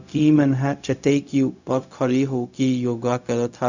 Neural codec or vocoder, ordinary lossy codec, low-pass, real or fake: codec, 16 kHz, 0.4 kbps, LongCat-Audio-Codec; none; 7.2 kHz; fake